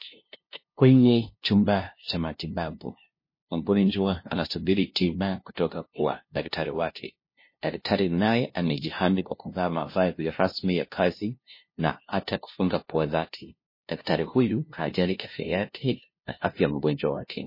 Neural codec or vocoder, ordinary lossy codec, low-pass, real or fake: codec, 16 kHz, 0.5 kbps, FunCodec, trained on LibriTTS, 25 frames a second; MP3, 24 kbps; 5.4 kHz; fake